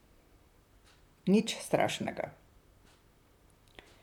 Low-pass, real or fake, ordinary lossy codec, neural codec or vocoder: 19.8 kHz; fake; none; vocoder, 44.1 kHz, 128 mel bands, Pupu-Vocoder